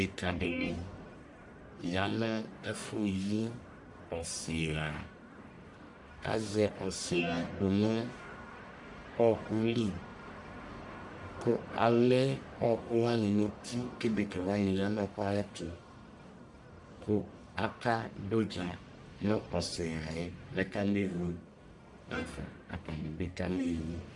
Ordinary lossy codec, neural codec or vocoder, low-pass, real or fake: MP3, 96 kbps; codec, 44.1 kHz, 1.7 kbps, Pupu-Codec; 10.8 kHz; fake